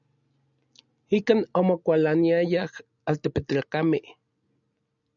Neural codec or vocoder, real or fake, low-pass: none; real; 7.2 kHz